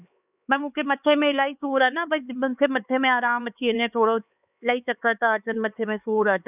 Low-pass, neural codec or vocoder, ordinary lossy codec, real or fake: 3.6 kHz; codec, 16 kHz, 4 kbps, X-Codec, HuBERT features, trained on LibriSpeech; none; fake